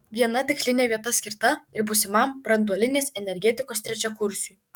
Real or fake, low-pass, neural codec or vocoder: fake; 19.8 kHz; codec, 44.1 kHz, 7.8 kbps, DAC